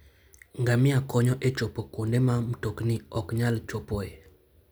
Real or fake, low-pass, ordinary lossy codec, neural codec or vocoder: real; none; none; none